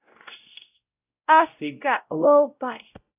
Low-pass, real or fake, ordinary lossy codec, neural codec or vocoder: 3.6 kHz; fake; AAC, 32 kbps; codec, 16 kHz, 0.5 kbps, X-Codec, WavLM features, trained on Multilingual LibriSpeech